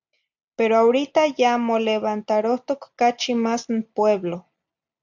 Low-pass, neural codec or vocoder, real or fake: 7.2 kHz; none; real